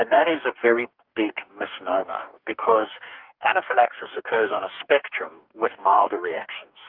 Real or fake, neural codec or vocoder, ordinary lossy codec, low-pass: fake; codec, 44.1 kHz, 2.6 kbps, DAC; Opus, 24 kbps; 5.4 kHz